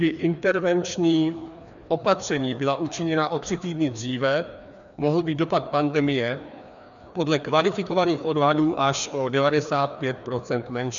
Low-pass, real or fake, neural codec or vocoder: 7.2 kHz; fake; codec, 16 kHz, 2 kbps, FreqCodec, larger model